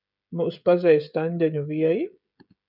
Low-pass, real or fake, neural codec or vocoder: 5.4 kHz; fake; codec, 16 kHz, 16 kbps, FreqCodec, smaller model